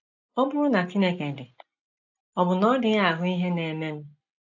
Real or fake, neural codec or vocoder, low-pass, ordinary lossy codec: real; none; 7.2 kHz; AAC, 48 kbps